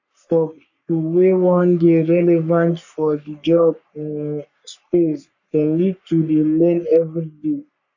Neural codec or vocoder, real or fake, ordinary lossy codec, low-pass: codec, 44.1 kHz, 3.4 kbps, Pupu-Codec; fake; none; 7.2 kHz